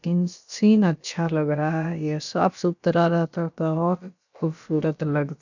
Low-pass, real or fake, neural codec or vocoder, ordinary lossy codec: 7.2 kHz; fake; codec, 16 kHz, about 1 kbps, DyCAST, with the encoder's durations; none